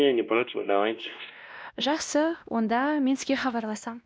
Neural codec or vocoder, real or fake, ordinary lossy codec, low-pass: codec, 16 kHz, 1 kbps, X-Codec, WavLM features, trained on Multilingual LibriSpeech; fake; none; none